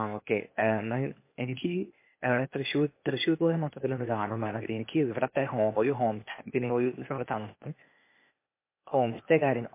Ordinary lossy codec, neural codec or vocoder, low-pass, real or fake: MP3, 24 kbps; codec, 16 kHz, 0.8 kbps, ZipCodec; 3.6 kHz; fake